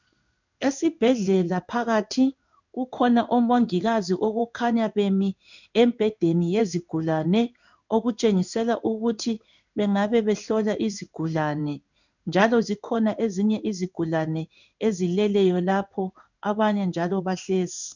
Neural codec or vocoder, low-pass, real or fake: codec, 16 kHz in and 24 kHz out, 1 kbps, XY-Tokenizer; 7.2 kHz; fake